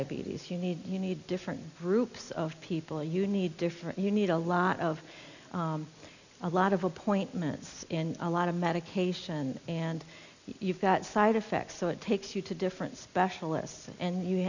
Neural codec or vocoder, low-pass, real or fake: none; 7.2 kHz; real